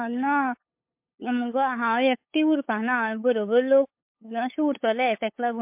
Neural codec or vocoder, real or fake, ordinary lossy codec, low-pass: codec, 16 kHz, 8 kbps, FunCodec, trained on LibriTTS, 25 frames a second; fake; MP3, 32 kbps; 3.6 kHz